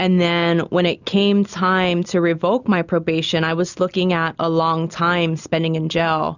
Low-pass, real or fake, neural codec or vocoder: 7.2 kHz; real; none